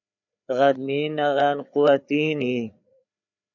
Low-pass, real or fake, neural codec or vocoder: 7.2 kHz; fake; codec, 16 kHz, 4 kbps, FreqCodec, larger model